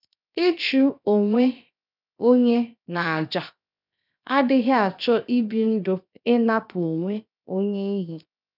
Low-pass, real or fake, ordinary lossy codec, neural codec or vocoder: 5.4 kHz; fake; none; codec, 16 kHz, 0.7 kbps, FocalCodec